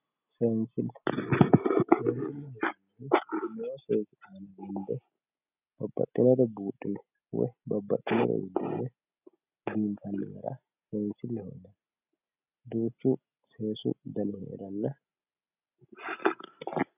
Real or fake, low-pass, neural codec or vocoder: real; 3.6 kHz; none